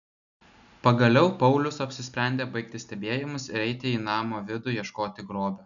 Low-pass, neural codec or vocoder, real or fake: 7.2 kHz; none; real